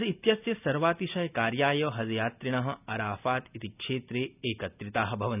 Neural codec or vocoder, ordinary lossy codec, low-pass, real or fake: none; none; 3.6 kHz; real